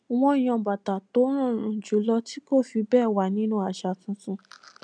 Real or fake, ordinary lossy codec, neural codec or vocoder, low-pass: real; none; none; 9.9 kHz